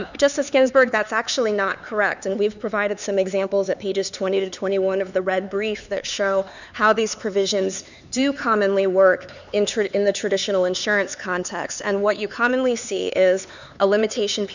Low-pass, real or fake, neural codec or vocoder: 7.2 kHz; fake; codec, 16 kHz, 4 kbps, X-Codec, HuBERT features, trained on LibriSpeech